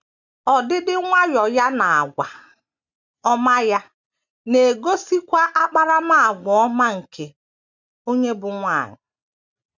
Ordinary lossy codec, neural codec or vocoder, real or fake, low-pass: none; none; real; 7.2 kHz